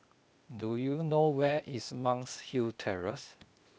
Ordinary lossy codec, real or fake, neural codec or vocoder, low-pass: none; fake; codec, 16 kHz, 0.8 kbps, ZipCodec; none